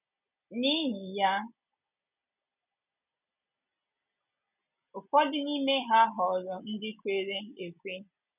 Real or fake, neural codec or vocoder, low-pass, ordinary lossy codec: real; none; 3.6 kHz; none